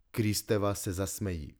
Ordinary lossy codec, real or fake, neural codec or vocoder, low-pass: none; real; none; none